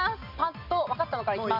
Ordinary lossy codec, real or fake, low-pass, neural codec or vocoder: none; real; 5.4 kHz; none